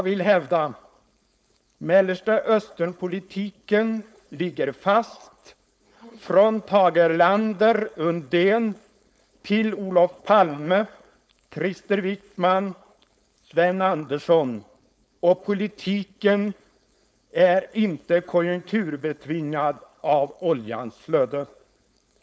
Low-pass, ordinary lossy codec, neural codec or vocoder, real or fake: none; none; codec, 16 kHz, 4.8 kbps, FACodec; fake